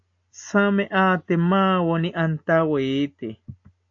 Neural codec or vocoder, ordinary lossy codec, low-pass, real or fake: none; AAC, 48 kbps; 7.2 kHz; real